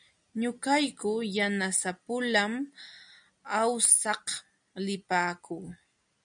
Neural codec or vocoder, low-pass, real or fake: none; 9.9 kHz; real